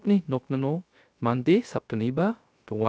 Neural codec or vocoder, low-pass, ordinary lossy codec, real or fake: codec, 16 kHz, 0.3 kbps, FocalCodec; none; none; fake